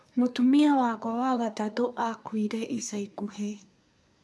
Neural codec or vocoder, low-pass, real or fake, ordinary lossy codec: codec, 24 kHz, 1 kbps, SNAC; none; fake; none